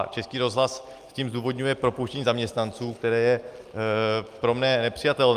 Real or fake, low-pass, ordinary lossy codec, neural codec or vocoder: real; 14.4 kHz; Opus, 32 kbps; none